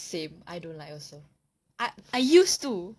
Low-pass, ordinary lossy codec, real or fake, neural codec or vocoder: none; none; real; none